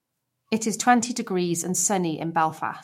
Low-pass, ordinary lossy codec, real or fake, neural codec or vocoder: 19.8 kHz; MP3, 64 kbps; fake; autoencoder, 48 kHz, 128 numbers a frame, DAC-VAE, trained on Japanese speech